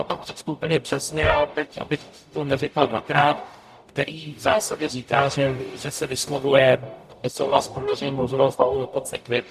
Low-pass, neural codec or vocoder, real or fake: 14.4 kHz; codec, 44.1 kHz, 0.9 kbps, DAC; fake